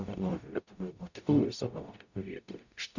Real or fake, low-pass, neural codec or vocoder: fake; 7.2 kHz; codec, 44.1 kHz, 0.9 kbps, DAC